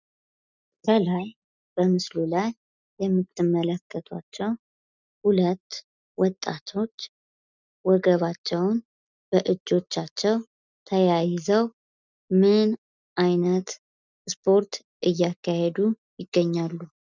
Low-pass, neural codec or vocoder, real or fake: 7.2 kHz; none; real